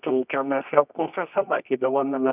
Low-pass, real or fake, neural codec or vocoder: 3.6 kHz; fake; codec, 24 kHz, 0.9 kbps, WavTokenizer, medium music audio release